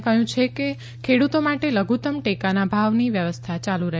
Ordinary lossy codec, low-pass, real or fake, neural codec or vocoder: none; none; real; none